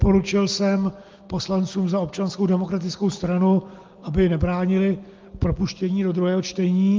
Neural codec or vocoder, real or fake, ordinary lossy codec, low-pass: none; real; Opus, 32 kbps; 7.2 kHz